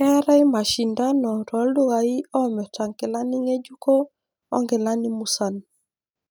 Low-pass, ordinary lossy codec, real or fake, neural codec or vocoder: none; none; real; none